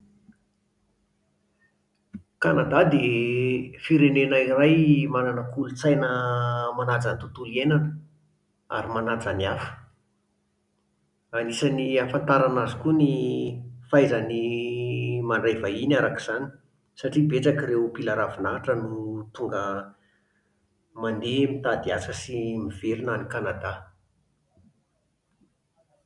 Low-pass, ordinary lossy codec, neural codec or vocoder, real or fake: 10.8 kHz; none; none; real